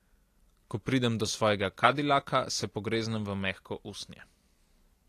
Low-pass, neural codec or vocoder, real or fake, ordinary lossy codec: 14.4 kHz; none; real; AAC, 48 kbps